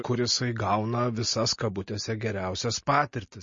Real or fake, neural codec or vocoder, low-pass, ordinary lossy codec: real; none; 7.2 kHz; MP3, 32 kbps